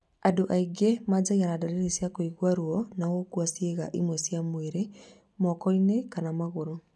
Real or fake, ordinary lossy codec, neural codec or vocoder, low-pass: real; none; none; none